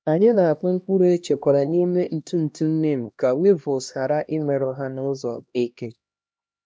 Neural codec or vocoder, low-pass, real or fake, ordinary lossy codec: codec, 16 kHz, 1 kbps, X-Codec, HuBERT features, trained on LibriSpeech; none; fake; none